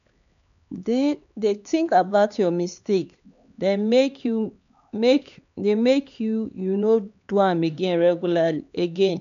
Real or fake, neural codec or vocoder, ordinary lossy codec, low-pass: fake; codec, 16 kHz, 4 kbps, X-Codec, HuBERT features, trained on LibriSpeech; none; 7.2 kHz